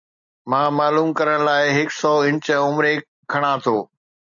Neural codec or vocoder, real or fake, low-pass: none; real; 7.2 kHz